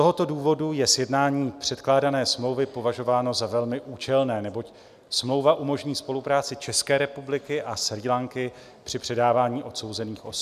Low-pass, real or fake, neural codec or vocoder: 14.4 kHz; real; none